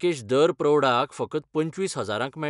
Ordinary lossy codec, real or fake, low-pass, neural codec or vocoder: none; real; 10.8 kHz; none